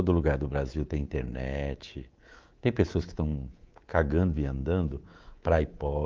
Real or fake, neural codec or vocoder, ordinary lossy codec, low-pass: fake; codec, 24 kHz, 3.1 kbps, DualCodec; Opus, 32 kbps; 7.2 kHz